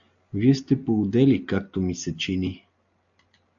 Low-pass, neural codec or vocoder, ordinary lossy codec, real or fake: 7.2 kHz; none; AAC, 64 kbps; real